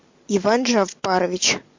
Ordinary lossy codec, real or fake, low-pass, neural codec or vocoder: MP3, 48 kbps; real; 7.2 kHz; none